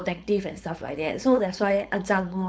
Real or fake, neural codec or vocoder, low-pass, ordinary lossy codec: fake; codec, 16 kHz, 4.8 kbps, FACodec; none; none